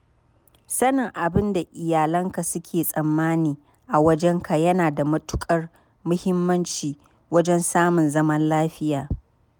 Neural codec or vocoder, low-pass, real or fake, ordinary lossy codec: none; none; real; none